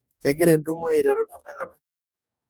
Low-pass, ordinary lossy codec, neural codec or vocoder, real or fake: none; none; codec, 44.1 kHz, 2.6 kbps, DAC; fake